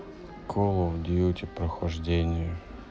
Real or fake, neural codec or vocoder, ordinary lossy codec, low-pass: real; none; none; none